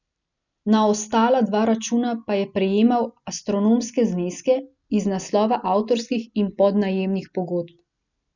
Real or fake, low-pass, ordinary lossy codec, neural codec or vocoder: real; 7.2 kHz; none; none